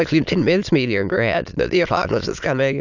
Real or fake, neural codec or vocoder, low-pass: fake; autoencoder, 22.05 kHz, a latent of 192 numbers a frame, VITS, trained on many speakers; 7.2 kHz